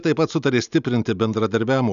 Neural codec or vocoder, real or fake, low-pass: none; real; 7.2 kHz